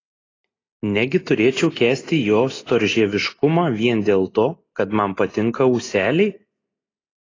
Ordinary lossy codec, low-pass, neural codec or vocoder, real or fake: AAC, 32 kbps; 7.2 kHz; none; real